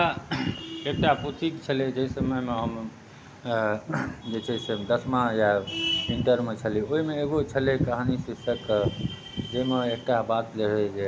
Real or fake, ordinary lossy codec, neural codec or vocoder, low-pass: real; none; none; none